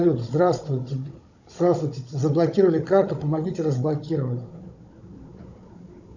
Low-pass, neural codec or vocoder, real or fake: 7.2 kHz; codec, 16 kHz, 16 kbps, FunCodec, trained on Chinese and English, 50 frames a second; fake